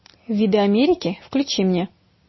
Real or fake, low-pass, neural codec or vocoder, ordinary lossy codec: real; 7.2 kHz; none; MP3, 24 kbps